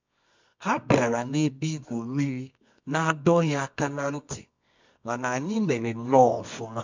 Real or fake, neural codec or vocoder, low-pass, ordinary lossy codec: fake; codec, 24 kHz, 0.9 kbps, WavTokenizer, medium music audio release; 7.2 kHz; MP3, 64 kbps